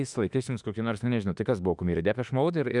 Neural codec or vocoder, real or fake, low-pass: autoencoder, 48 kHz, 32 numbers a frame, DAC-VAE, trained on Japanese speech; fake; 10.8 kHz